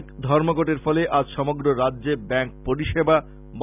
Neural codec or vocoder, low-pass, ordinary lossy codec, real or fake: none; 3.6 kHz; none; real